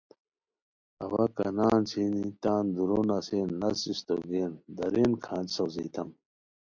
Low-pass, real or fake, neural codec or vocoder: 7.2 kHz; real; none